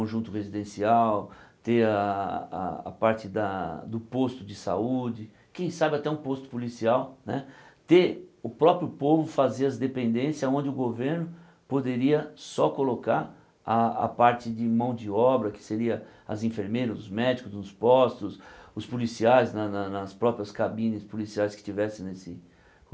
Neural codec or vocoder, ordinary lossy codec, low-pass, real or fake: none; none; none; real